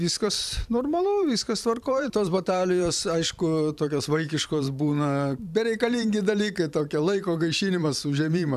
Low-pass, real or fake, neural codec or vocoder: 14.4 kHz; fake; vocoder, 44.1 kHz, 128 mel bands every 512 samples, BigVGAN v2